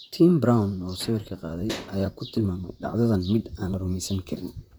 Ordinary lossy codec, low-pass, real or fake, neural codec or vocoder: none; none; fake; vocoder, 44.1 kHz, 128 mel bands, Pupu-Vocoder